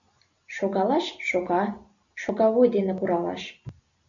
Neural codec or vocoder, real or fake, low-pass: none; real; 7.2 kHz